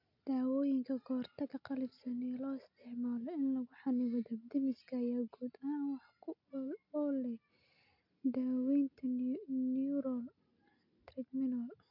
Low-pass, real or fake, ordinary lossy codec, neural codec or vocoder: 5.4 kHz; real; none; none